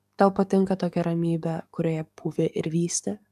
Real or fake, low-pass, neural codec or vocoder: fake; 14.4 kHz; codec, 44.1 kHz, 7.8 kbps, DAC